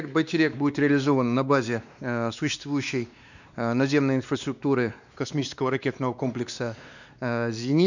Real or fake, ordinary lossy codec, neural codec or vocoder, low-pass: fake; none; codec, 16 kHz, 2 kbps, X-Codec, WavLM features, trained on Multilingual LibriSpeech; 7.2 kHz